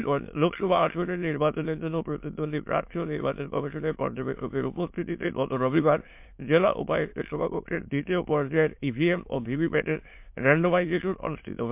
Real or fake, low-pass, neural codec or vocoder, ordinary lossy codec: fake; 3.6 kHz; autoencoder, 22.05 kHz, a latent of 192 numbers a frame, VITS, trained on many speakers; MP3, 32 kbps